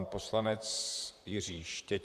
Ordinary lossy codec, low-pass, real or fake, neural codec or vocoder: Opus, 64 kbps; 14.4 kHz; fake; vocoder, 44.1 kHz, 128 mel bands, Pupu-Vocoder